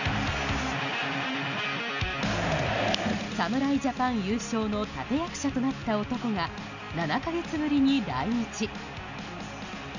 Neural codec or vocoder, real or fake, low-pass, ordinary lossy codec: none; real; 7.2 kHz; none